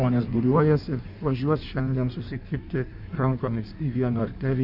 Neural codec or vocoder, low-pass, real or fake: codec, 16 kHz in and 24 kHz out, 1.1 kbps, FireRedTTS-2 codec; 5.4 kHz; fake